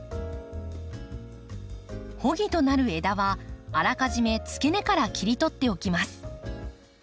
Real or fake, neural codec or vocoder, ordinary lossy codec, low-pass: real; none; none; none